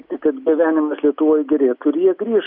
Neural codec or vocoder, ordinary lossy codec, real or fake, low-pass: none; MP3, 48 kbps; real; 5.4 kHz